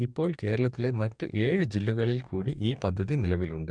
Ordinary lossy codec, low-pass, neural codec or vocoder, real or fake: none; 9.9 kHz; codec, 44.1 kHz, 2.6 kbps, DAC; fake